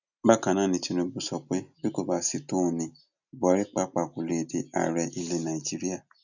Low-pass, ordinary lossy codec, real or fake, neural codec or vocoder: 7.2 kHz; none; real; none